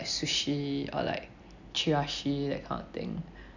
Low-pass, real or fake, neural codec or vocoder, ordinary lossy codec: 7.2 kHz; real; none; none